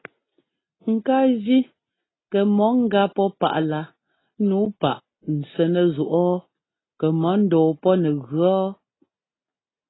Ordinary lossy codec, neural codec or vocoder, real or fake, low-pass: AAC, 16 kbps; none; real; 7.2 kHz